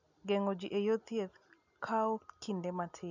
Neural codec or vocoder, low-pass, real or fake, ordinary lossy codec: none; 7.2 kHz; real; none